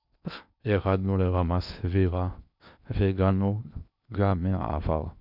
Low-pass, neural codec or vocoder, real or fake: 5.4 kHz; codec, 16 kHz in and 24 kHz out, 0.8 kbps, FocalCodec, streaming, 65536 codes; fake